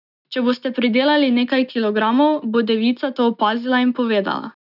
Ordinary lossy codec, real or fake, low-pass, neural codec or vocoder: none; real; 5.4 kHz; none